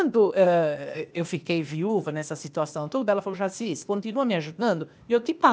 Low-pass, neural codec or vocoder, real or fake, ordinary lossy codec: none; codec, 16 kHz, 0.8 kbps, ZipCodec; fake; none